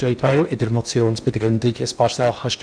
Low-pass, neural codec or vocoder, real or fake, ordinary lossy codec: 9.9 kHz; codec, 16 kHz in and 24 kHz out, 0.8 kbps, FocalCodec, streaming, 65536 codes; fake; none